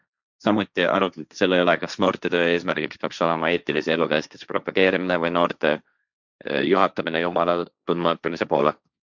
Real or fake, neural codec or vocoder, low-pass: fake; codec, 16 kHz, 1.1 kbps, Voila-Tokenizer; 7.2 kHz